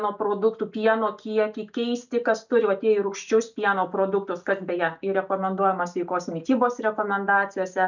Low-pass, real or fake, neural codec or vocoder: 7.2 kHz; real; none